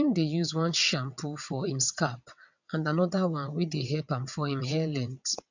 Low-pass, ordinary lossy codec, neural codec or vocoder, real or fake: 7.2 kHz; none; vocoder, 22.05 kHz, 80 mel bands, WaveNeXt; fake